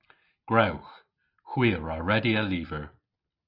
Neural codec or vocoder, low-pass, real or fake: none; 5.4 kHz; real